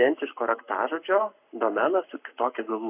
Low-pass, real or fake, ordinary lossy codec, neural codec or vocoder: 3.6 kHz; fake; AAC, 32 kbps; codec, 44.1 kHz, 7.8 kbps, Pupu-Codec